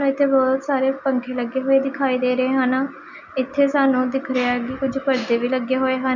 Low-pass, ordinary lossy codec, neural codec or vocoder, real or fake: 7.2 kHz; none; none; real